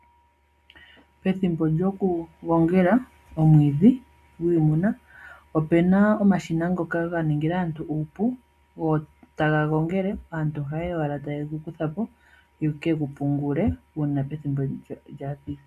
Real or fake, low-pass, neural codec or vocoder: real; 14.4 kHz; none